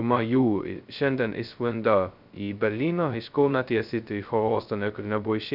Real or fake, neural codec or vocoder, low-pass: fake; codec, 16 kHz, 0.2 kbps, FocalCodec; 5.4 kHz